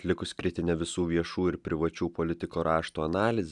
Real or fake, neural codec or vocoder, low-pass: real; none; 10.8 kHz